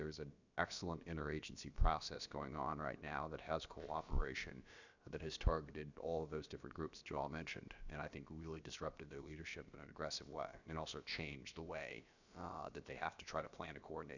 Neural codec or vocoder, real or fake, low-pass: codec, 16 kHz, about 1 kbps, DyCAST, with the encoder's durations; fake; 7.2 kHz